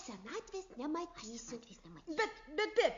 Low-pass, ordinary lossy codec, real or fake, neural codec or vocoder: 7.2 kHz; MP3, 48 kbps; real; none